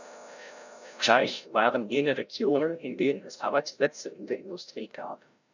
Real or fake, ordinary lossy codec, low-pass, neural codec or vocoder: fake; none; 7.2 kHz; codec, 16 kHz, 0.5 kbps, FreqCodec, larger model